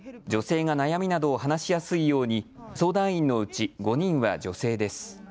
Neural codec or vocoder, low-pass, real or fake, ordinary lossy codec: none; none; real; none